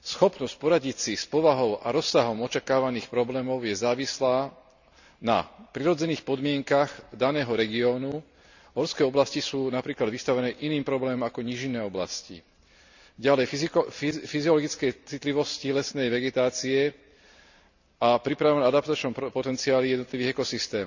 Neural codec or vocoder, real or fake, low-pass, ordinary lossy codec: none; real; 7.2 kHz; none